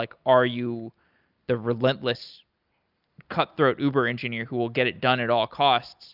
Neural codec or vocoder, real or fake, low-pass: none; real; 5.4 kHz